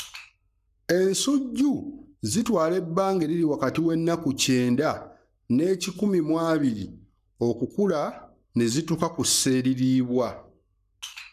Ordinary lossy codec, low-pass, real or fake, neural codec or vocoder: none; 14.4 kHz; fake; codec, 44.1 kHz, 7.8 kbps, Pupu-Codec